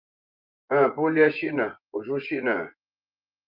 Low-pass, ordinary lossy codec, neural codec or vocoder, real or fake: 5.4 kHz; Opus, 24 kbps; vocoder, 24 kHz, 100 mel bands, Vocos; fake